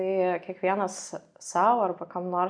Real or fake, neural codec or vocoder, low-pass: real; none; 9.9 kHz